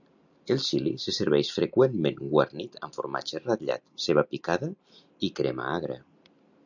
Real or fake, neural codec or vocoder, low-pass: real; none; 7.2 kHz